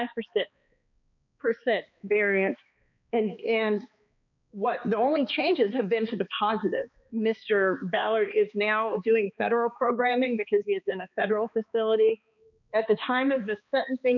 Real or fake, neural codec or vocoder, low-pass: fake; codec, 16 kHz, 2 kbps, X-Codec, HuBERT features, trained on balanced general audio; 7.2 kHz